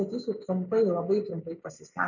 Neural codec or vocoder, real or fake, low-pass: none; real; 7.2 kHz